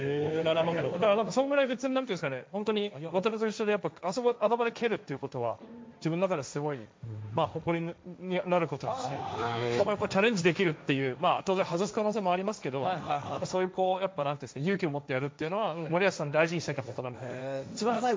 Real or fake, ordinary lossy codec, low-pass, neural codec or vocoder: fake; none; none; codec, 16 kHz, 1.1 kbps, Voila-Tokenizer